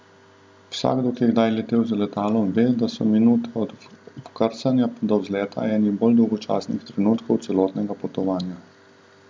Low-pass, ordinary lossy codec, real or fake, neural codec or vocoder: none; none; real; none